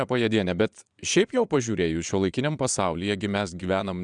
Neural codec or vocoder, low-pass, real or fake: vocoder, 22.05 kHz, 80 mel bands, Vocos; 9.9 kHz; fake